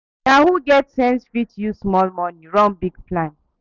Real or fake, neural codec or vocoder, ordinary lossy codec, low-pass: real; none; none; 7.2 kHz